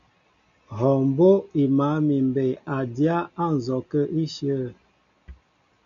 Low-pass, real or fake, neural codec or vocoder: 7.2 kHz; real; none